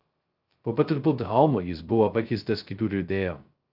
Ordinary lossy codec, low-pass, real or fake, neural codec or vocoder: Opus, 24 kbps; 5.4 kHz; fake; codec, 16 kHz, 0.2 kbps, FocalCodec